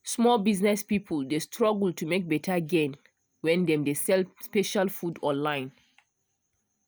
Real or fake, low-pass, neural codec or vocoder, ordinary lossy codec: real; none; none; none